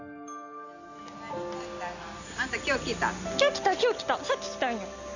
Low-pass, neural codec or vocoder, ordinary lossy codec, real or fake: 7.2 kHz; none; none; real